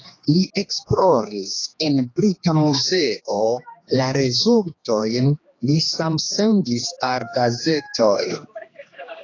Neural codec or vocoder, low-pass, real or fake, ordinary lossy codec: codec, 16 kHz, 2 kbps, X-Codec, HuBERT features, trained on general audio; 7.2 kHz; fake; AAC, 32 kbps